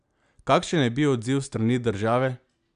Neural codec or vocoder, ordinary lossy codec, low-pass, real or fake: none; MP3, 96 kbps; 9.9 kHz; real